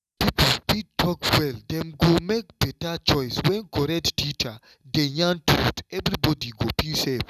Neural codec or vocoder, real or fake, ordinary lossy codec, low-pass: none; real; none; 14.4 kHz